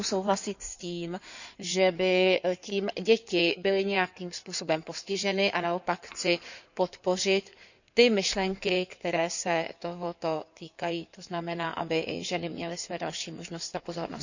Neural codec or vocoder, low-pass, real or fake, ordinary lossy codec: codec, 16 kHz in and 24 kHz out, 2.2 kbps, FireRedTTS-2 codec; 7.2 kHz; fake; none